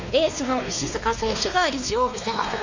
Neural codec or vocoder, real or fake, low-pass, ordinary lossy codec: codec, 16 kHz, 2 kbps, X-Codec, WavLM features, trained on Multilingual LibriSpeech; fake; 7.2 kHz; Opus, 64 kbps